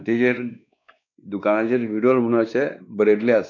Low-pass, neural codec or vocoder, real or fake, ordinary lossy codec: 7.2 kHz; codec, 16 kHz, 2 kbps, X-Codec, WavLM features, trained on Multilingual LibriSpeech; fake; none